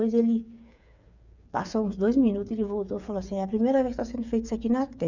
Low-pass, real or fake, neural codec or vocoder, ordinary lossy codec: 7.2 kHz; fake; codec, 16 kHz, 8 kbps, FreqCodec, smaller model; none